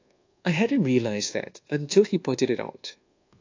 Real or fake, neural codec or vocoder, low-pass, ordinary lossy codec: fake; codec, 24 kHz, 1.2 kbps, DualCodec; 7.2 kHz; AAC, 48 kbps